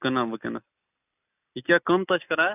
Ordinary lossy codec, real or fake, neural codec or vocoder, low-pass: none; real; none; 3.6 kHz